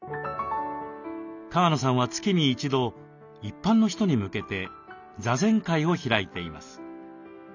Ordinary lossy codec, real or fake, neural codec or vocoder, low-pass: none; real; none; 7.2 kHz